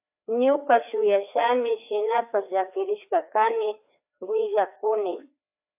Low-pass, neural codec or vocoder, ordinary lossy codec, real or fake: 3.6 kHz; codec, 16 kHz, 2 kbps, FreqCodec, larger model; AAC, 32 kbps; fake